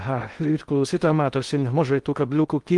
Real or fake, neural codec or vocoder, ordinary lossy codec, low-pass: fake; codec, 16 kHz in and 24 kHz out, 0.6 kbps, FocalCodec, streaming, 2048 codes; Opus, 24 kbps; 10.8 kHz